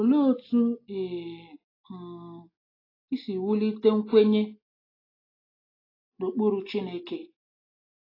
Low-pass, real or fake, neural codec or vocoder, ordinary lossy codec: 5.4 kHz; real; none; AAC, 24 kbps